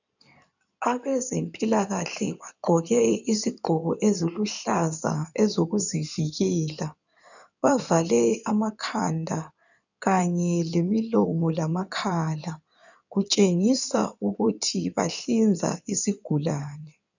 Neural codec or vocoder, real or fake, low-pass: codec, 16 kHz in and 24 kHz out, 2.2 kbps, FireRedTTS-2 codec; fake; 7.2 kHz